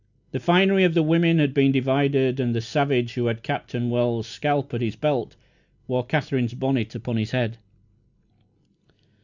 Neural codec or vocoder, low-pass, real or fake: none; 7.2 kHz; real